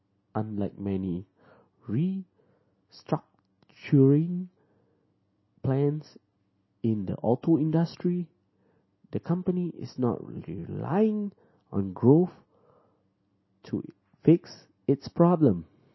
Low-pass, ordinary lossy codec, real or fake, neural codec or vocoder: 7.2 kHz; MP3, 24 kbps; real; none